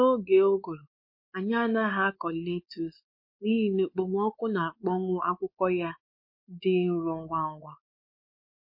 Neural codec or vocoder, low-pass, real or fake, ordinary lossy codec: codec, 16 kHz, 4 kbps, X-Codec, WavLM features, trained on Multilingual LibriSpeech; 5.4 kHz; fake; MP3, 32 kbps